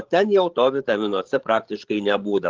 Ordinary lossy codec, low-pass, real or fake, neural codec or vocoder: Opus, 16 kbps; 7.2 kHz; fake; codec, 16 kHz, 16 kbps, FreqCodec, larger model